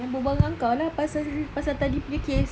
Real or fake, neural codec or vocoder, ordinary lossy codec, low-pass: real; none; none; none